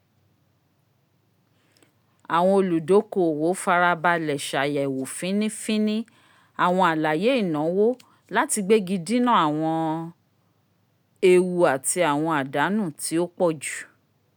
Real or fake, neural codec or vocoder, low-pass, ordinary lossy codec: real; none; none; none